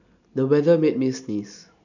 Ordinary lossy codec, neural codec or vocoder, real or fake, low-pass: none; none; real; 7.2 kHz